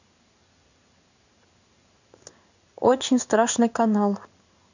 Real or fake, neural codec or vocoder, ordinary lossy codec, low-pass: fake; codec, 16 kHz in and 24 kHz out, 1 kbps, XY-Tokenizer; none; 7.2 kHz